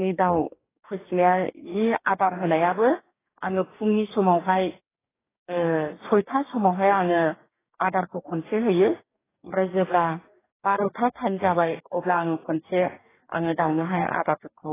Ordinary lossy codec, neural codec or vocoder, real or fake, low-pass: AAC, 16 kbps; codec, 44.1 kHz, 2.6 kbps, DAC; fake; 3.6 kHz